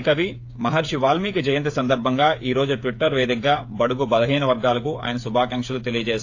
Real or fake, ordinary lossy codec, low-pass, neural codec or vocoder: fake; AAC, 48 kbps; 7.2 kHz; codec, 16 kHz, 8 kbps, FreqCodec, smaller model